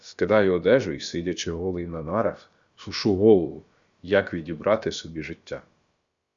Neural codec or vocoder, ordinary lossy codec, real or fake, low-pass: codec, 16 kHz, about 1 kbps, DyCAST, with the encoder's durations; Opus, 64 kbps; fake; 7.2 kHz